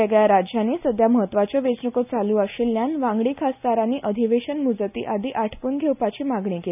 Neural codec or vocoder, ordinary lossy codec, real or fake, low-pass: none; none; real; 3.6 kHz